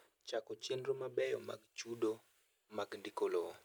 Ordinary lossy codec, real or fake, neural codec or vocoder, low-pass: none; real; none; none